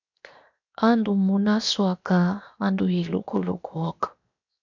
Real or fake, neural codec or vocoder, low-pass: fake; codec, 16 kHz, 0.7 kbps, FocalCodec; 7.2 kHz